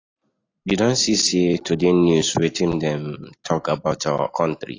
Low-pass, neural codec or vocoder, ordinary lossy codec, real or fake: 7.2 kHz; none; AAC, 32 kbps; real